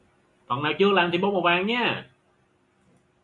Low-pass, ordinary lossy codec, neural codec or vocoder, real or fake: 10.8 kHz; MP3, 64 kbps; none; real